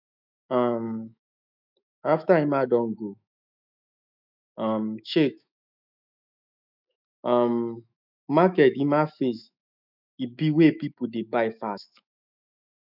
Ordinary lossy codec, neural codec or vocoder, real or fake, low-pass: none; autoencoder, 48 kHz, 128 numbers a frame, DAC-VAE, trained on Japanese speech; fake; 5.4 kHz